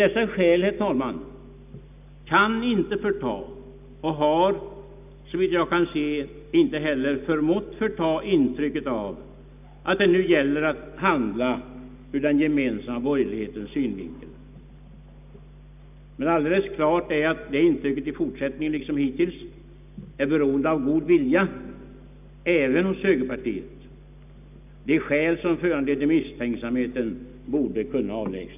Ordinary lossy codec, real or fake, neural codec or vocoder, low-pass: none; real; none; 3.6 kHz